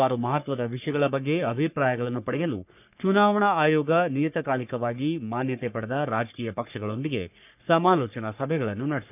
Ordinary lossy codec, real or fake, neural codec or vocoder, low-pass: none; fake; codec, 44.1 kHz, 3.4 kbps, Pupu-Codec; 3.6 kHz